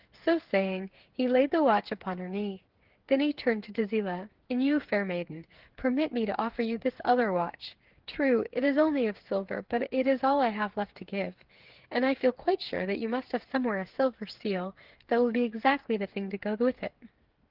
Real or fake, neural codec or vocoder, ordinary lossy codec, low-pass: fake; codec, 16 kHz, 8 kbps, FreqCodec, smaller model; Opus, 16 kbps; 5.4 kHz